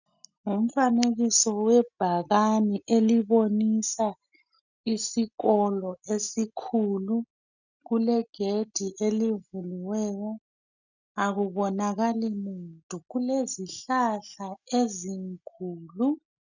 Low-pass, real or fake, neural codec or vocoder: 7.2 kHz; real; none